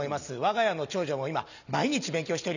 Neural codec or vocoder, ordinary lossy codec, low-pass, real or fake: none; none; 7.2 kHz; real